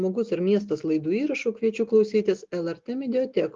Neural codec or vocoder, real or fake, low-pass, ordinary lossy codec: none; real; 7.2 kHz; Opus, 32 kbps